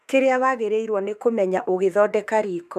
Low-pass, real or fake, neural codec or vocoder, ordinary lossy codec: 14.4 kHz; fake; autoencoder, 48 kHz, 32 numbers a frame, DAC-VAE, trained on Japanese speech; none